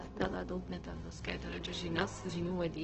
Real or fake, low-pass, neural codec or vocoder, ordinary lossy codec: fake; 7.2 kHz; codec, 16 kHz, 0.4 kbps, LongCat-Audio-Codec; Opus, 16 kbps